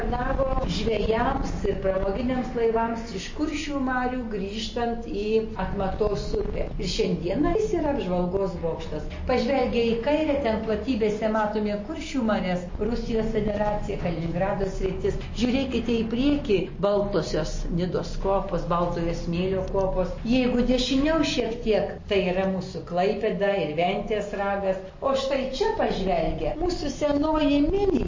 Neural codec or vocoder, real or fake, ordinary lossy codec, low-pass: none; real; MP3, 32 kbps; 7.2 kHz